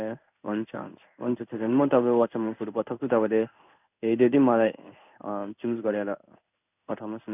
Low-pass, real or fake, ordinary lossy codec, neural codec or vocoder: 3.6 kHz; fake; none; codec, 16 kHz in and 24 kHz out, 1 kbps, XY-Tokenizer